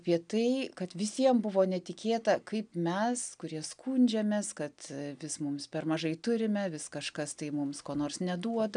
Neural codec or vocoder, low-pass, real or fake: none; 9.9 kHz; real